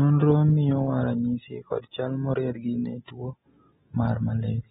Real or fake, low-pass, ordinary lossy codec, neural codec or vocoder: real; 19.8 kHz; AAC, 16 kbps; none